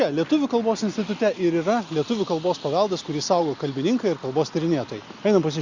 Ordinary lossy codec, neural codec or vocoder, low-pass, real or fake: Opus, 64 kbps; none; 7.2 kHz; real